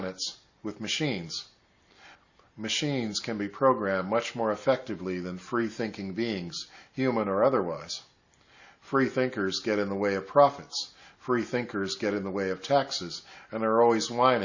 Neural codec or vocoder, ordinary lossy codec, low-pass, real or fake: none; Opus, 64 kbps; 7.2 kHz; real